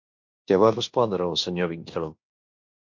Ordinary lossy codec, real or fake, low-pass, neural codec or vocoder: MP3, 48 kbps; fake; 7.2 kHz; codec, 16 kHz in and 24 kHz out, 0.9 kbps, LongCat-Audio-Codec, fine tuned four codebook decoder